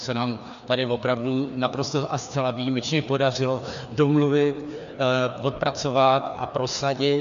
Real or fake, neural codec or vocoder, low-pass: fake; codec, 16 kHz, 2 kbps, FreqCodec, larger model; 7.2 kHz